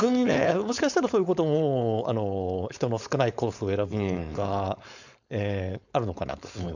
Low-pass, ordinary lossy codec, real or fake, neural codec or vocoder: 7.2 kHz; none; fake; codec, 16 kHz, 4.8 kbps, FACodec